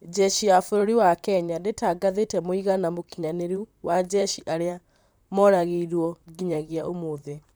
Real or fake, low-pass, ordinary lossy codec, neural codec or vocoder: fake; none; none; vocoder, 44.1 kHz, 128 mel bands, Pupu-Vocoder